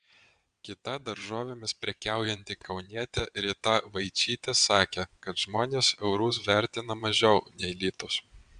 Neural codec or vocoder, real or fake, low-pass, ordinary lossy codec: vocoder, 22.05 kHz, 80 mel bands, WaveNeXt; fake; 9.9 kHz; Opus, 64 kbps